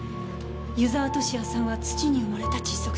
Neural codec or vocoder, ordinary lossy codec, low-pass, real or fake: none; none; none; real